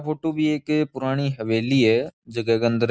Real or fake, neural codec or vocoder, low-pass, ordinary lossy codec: real; none; none; none